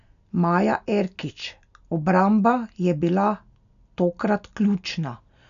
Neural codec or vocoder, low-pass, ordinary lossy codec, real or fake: none; 7.2 kHz; none; real